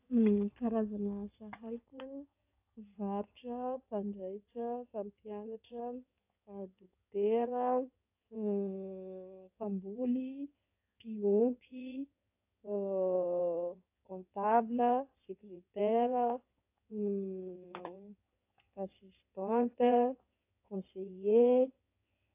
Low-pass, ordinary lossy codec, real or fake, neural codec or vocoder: 3.6 kHz; AAC, 32 kbps; fake; codec, 16 kHz in and 24 kHz out, 2.2 kbps, FireRedTTS-2 codec